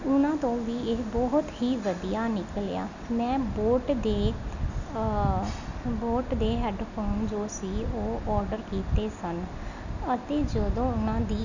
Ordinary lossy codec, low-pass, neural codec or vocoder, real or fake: none; 7.2 kHz; none; real